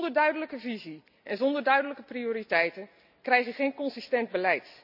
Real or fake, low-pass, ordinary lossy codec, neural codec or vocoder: real; 5.4 kHz; none; none